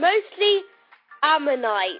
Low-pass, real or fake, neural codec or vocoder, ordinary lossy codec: 5.4 kHz; real; none; MP3, 48 kbps